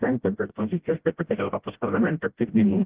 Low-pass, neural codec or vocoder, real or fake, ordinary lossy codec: 3.6 kHz; codec, 16 kHz, 0.5 kbps, FreqCodec, smaller model; fake; Opus, 16 kbps